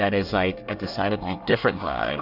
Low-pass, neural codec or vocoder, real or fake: 5.4 kHz; codec, 24 kHz, 1 kbps, SNAC; fake